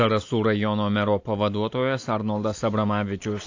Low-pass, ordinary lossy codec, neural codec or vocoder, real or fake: 7.2 kHz; AAC, 48 kbps; none; real